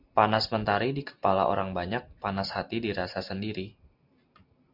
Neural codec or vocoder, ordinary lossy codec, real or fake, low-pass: none; MP3, 48 kbps; real; 5.4 kHz